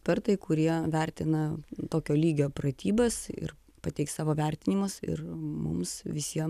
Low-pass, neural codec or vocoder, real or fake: 14.4 kHz; none; real